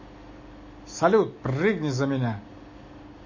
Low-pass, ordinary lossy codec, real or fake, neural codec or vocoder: 7.2 kHz; MP3, 32 kbps; real; none